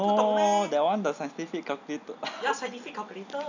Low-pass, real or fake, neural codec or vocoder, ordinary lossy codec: 7.2 kHz; real; none; none